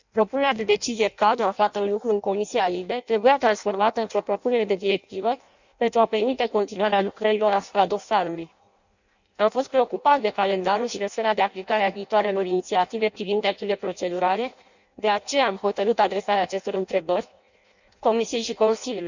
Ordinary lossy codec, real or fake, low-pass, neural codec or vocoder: none; fake; 7.2 kHz; codec, 16 kHz in and 24 kHz out, 0.6 kbps, FireRedTTS-2 codec